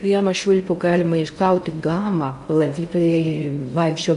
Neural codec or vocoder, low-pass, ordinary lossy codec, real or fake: codec, 16 kHz in and 24 kHz out, 0.6 kbps, FocalCodec, streaming, 4096 codes; 10.8 kHz; MP3, 64 kbps; fake